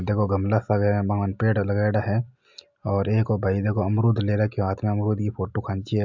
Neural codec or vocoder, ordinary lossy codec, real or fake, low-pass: none; MP3, 64 kbps; real; 7.2 kHz